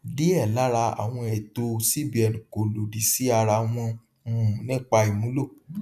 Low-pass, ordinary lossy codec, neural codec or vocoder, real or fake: 14.4 kHz; none; none; real